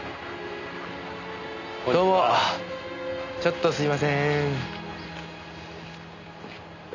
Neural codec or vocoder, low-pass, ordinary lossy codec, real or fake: none; 7.2 kHz; none; real